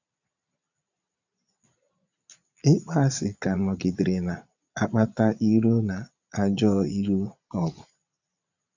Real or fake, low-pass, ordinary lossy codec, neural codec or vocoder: fake; 7.2 kHz; none; vocoder, 22.05 kHz, 80 mel bands, Vocos